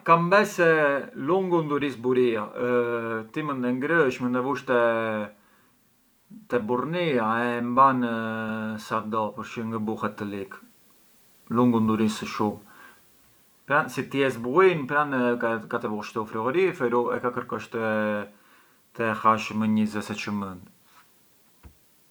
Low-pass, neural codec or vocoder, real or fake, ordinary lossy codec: none; none; real; none